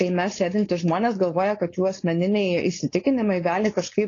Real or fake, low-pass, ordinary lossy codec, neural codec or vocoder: fake; 7.2 kHz; AAC, 32 kbps; codec, 16 kHz, 4.8 kbps, FACodec